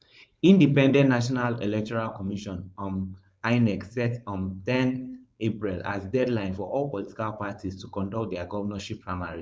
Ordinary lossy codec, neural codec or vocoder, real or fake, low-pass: none; codec, 16 kHz, 4.8 kbps, FACodec; fake; none